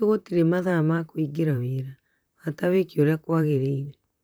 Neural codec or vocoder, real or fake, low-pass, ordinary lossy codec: vocoder, 44.1 kHz, 128 mel bands, Pupu-Vocoder; fake; none; none